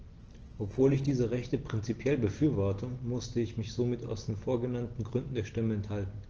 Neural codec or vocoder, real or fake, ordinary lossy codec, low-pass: none; real; Opus, 16 kbps; 7.2 kHz